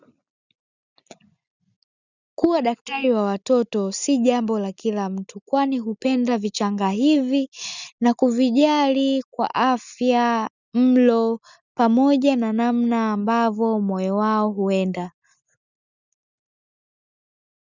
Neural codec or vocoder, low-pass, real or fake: none; 7.2 kHz; real